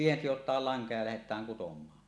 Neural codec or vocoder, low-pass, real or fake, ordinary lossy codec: none; none; real; none